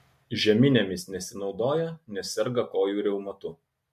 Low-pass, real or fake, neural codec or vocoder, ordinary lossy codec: 14.4 kHz; real; none; MP3, 64 kbps